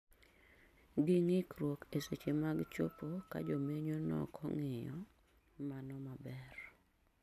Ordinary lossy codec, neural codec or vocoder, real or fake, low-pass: none; none; real; 14.4 kHz